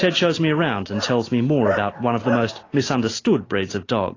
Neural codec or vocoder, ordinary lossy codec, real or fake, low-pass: none; AAC, 32 kbps; real; 7.2 kHz